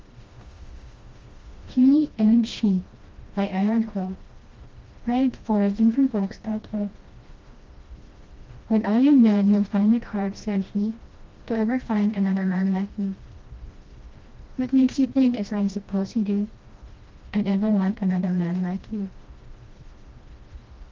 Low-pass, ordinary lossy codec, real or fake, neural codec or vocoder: 7.2 kHz; Opus, 32 kbps; fake; codec, 16 kHz, 1 kbps, FreqCodec, smaller model